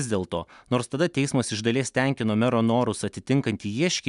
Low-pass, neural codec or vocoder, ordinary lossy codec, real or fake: 10.8 kHz; none; MP3, 96 kbps; real